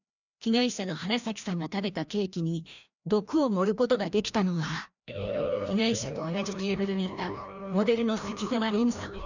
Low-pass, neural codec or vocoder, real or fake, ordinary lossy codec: 7.2 kHz; codec, 16 kHz, 1 kbps, FreqCodec, larger model; fake; none